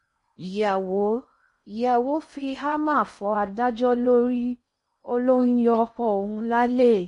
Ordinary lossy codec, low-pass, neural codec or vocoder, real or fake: MP3, 48 kbps; 10.8 kHz; codec, 16 kHz in and 24 kHz out, 0.6 kbps, FocalCodec, streaming, 4096 codes; fake